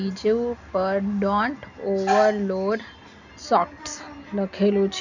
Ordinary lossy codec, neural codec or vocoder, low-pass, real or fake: none; none; 7.2 kHz; real